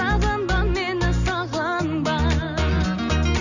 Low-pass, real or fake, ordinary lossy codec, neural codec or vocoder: 7.2 kHz; real; none; none